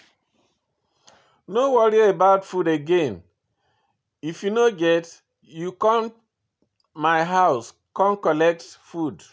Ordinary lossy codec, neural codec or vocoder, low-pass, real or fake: none; none; none; real